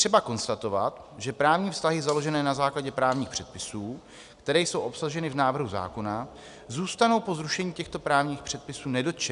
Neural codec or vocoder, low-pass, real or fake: none; 10.8 kHz; real